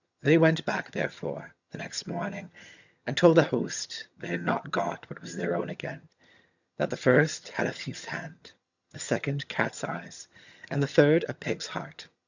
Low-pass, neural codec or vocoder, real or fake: 7.2 kHz; vocoder, 22.05 kHz, 80 mel bands, HiFi-GAN; fake